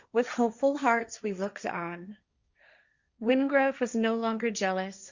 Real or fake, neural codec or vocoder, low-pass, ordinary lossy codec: fake; codec, 16 kHz, 1.1 kbps, Voila-Tokenizer; 7.2 kHz; Opus, 64 kbps